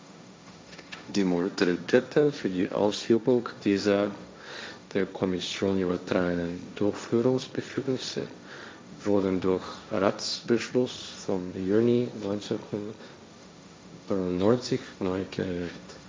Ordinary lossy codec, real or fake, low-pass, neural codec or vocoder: none; fake; none; codec, 16 kHz, 1.1 kbps, Voila-Tokenizer